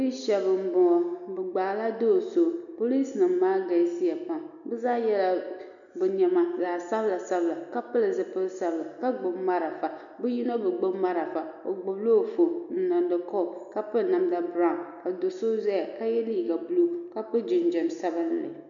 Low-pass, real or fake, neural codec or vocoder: 7.2 kHz; real; none